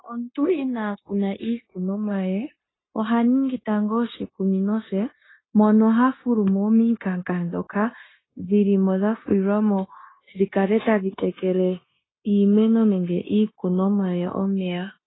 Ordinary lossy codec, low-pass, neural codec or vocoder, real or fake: AAC, 16 kbps; 7.2 kHz; codec, 16 kHz, 0.9 kbps, LongCat-Audio-Codec; fake